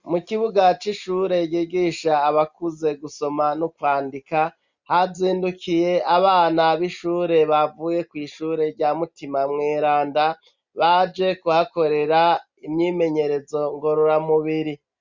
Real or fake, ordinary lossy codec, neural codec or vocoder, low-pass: real; Opus, 64 kbps; none; 7.2 kHz